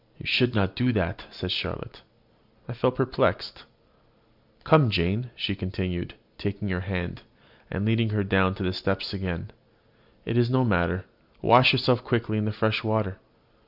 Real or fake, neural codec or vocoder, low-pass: real; none; 5.4 kHz